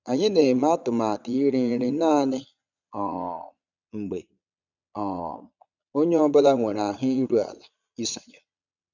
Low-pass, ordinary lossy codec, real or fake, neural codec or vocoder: 7.2 kHz; none; fake; vocoder, 22.05 kHz, 80 mel bands, Vocos